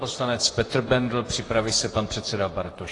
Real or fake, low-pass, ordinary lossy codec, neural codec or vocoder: fake; 10.8 kHz; AAC, 32 kbps; vocoder, 44.1 kHz, 128 mel bands, Pupu-Vocoder